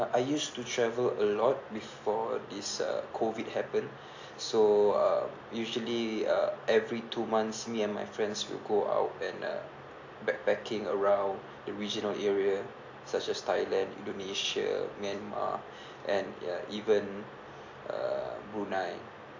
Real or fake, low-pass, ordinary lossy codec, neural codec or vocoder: real; 7.2 kHz; AAC, 48 kbps; none